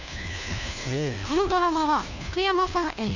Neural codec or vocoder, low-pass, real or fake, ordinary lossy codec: codec, 16 kHz, 1 kbps, FunCodec, trained on LibriTTS, 50 frames a second; 7.2 kHz; fake; none